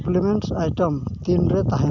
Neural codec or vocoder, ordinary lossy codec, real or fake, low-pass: none; none; real; 7.2 kHz